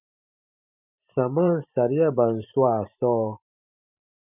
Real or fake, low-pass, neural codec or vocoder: fake; 3.6 kHz; vocoder, 44.1 kHz, 128 mel bands every 512 samples, BigVGAN v2